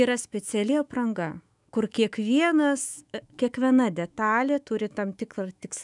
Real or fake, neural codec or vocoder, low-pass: fake; autoencoder, 48 kHz, 128 numbers a frame, DAC-VAE, trained on Japanese speech; 10.8 kHz